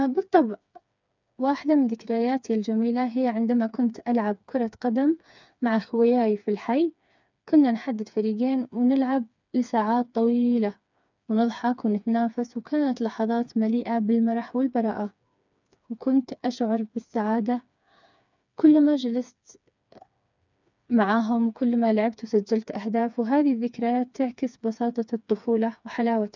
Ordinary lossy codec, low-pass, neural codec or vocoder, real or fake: none; 7.2 kHz; codec, 16 kHz, 4 kbps, FreqCodec, smaller model; fake